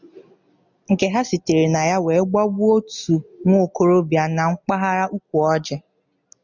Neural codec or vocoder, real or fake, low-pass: none; real; 7.2 kHz